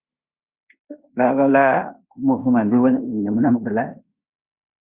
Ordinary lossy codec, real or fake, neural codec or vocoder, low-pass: Opus, 64 kbps; fake; codec, 16 kHz in and 24 kHz out, 0.9 kbps, LongCat-Audio-Codec, fine tuned four codebook decoder; 3.6 kHz